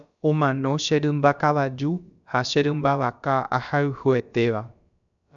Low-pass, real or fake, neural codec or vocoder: 7.2 kHz; fake; codec, 16 kHz, about 1 kbps, DyCAST, with the encoder's durations